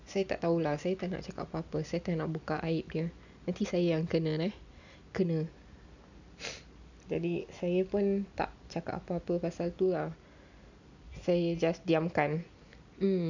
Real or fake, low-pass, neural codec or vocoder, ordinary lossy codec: real; 7.2 kHz; none; none